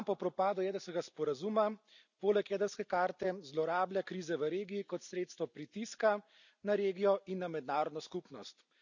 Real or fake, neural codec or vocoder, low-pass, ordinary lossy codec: real; none; 7.2 kHz; none